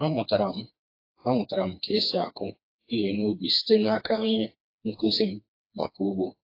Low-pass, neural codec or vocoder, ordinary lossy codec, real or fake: 5.4 kHz; codec, 16 kHz, 2 kbps, FreqCodec, smaller model; AAC, 32 kbps; fake